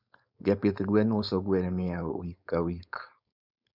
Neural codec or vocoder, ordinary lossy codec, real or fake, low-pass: codec, 16 kHz, 4.8 kbps, FACodec; none; fake; 5.4 kHz